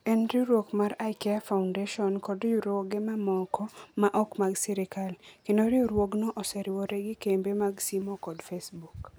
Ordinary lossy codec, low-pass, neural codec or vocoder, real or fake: none; none; none; real